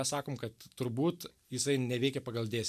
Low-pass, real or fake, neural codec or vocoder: 14.4 kHz; real; none